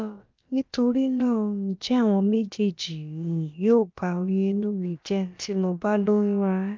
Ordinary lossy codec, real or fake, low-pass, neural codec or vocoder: Opus, 24 kbps; fake; 7.2 kHz; codec, 16 kHz, about 1 kbps, DyCAST, with the encoder's durations